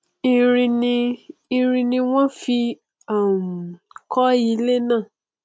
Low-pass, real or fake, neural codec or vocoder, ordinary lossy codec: none; real; none; none